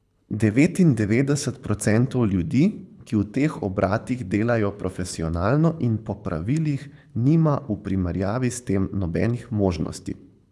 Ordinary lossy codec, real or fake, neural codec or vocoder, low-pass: none; fake; codec, 24 kHz, 6 kbps, HILCodec; none